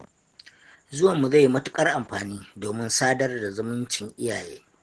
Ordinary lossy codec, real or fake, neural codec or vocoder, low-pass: Opus, 16 kbps; real; none; 10.8 kHz